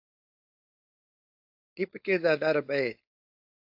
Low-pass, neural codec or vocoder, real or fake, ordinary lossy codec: 5.4 kHz; codec, 16 kHz, 4.8 kbps, FACodec; fake; AAC, 48 kbps